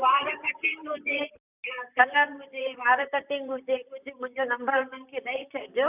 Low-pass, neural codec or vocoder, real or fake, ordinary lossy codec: 3.6 kHz; vocoder, 44.1 kHz, 128 mel bands, Pupu-Vocoder; fake; none